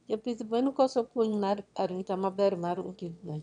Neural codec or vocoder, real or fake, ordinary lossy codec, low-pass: autoencoder, 22.05 kHz, a latent of 192 numbers a frame, VITS, trained on one speaker; fake; none; 9.9 kHz